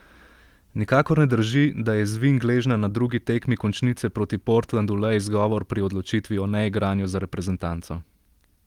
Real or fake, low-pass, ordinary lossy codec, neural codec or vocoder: real; 19.8 kHz; Opus, 24 kbps; none